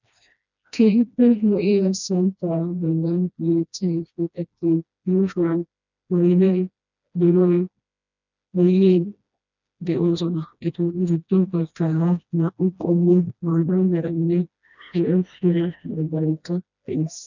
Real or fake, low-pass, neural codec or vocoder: fake; 7.2 kHz; codec, 16 kHz, 1 kbps, FreqCodec, smaller model